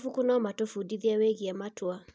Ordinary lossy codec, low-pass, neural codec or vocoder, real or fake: none; none; none; real